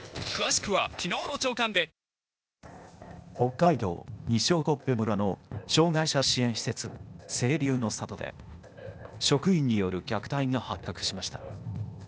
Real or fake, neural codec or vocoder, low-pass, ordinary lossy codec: fake; codec, 16 kHz, 0.8 kbps, ZipCodec; none; none